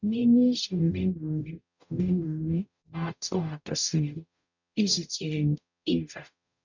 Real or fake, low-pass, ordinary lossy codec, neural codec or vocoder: fake; 7.2 kHz; none; codec, 44.1 kHz, 0.9 kbps, DAC